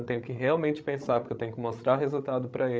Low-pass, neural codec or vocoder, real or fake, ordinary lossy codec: none; codec, 16 kHz, 16 kbps, FreqCodec, larger model; fake; none